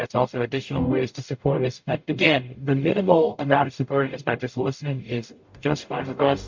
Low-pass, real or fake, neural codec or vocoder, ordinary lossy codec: 7.2 kHz; fake; codec, 44.1 kHz, 0.9 kbps, DAC; MP3, 48 kbps